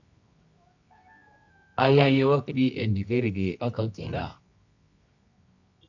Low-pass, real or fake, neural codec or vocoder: 7.2 kHz; fake; codec, 24 kHz, 0.9 kbps, WavTokenizer, medium music audio release